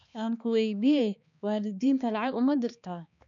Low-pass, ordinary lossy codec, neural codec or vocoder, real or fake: 7.2 kHz; none; codec, 16 kHz, 2 kbps, X-Codec, HuBERT features, trained on balanced general audio; fake